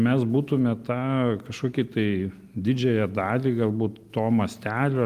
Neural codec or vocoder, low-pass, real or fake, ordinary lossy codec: none; 14.4 kHz; real; Opus, 32 kbps